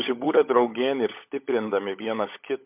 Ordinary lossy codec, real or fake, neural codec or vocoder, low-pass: MP3, 32 kbps; fake; codec, 16 kHz, 16 kbps, FunCodec, trained on LibriTTS, 50 frames a second; 3.6 kHz